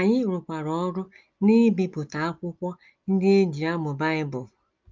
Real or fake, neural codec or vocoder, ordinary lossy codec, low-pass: real; none; Opus, 32 kbps; 7.2 kHz